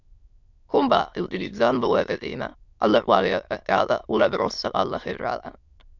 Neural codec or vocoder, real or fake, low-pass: autoencoder, 22.05 kHz, a latent of 192 numbers a frame, VITS, trained on many speakers; fake; 7.2 kHz